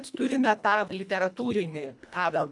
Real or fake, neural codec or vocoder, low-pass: fake; codec, 24 kHz, 1.5 kbps, HILCodec; 10.8 kHz